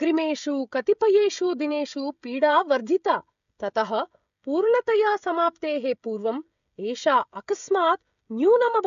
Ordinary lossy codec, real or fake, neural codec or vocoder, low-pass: AAC, 96 kbps; fake; codec, 16 kHz, 16 kbps, FreqCodec, smaller model; 7.2 kHz